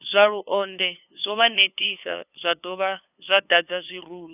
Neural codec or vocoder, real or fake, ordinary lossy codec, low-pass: codec, 16 kHz, 2 kbps, FunCodec, trained on LibriTTS, 25 frames a second; fake; none; 3.6 kHz